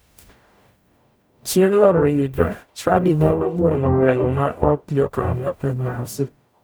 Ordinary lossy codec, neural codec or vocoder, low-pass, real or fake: none; codec, 44.1 kHz, 0.9 kbps, DAC; none; fake